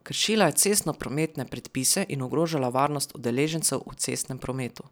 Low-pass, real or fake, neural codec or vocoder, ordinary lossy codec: none; real; none; none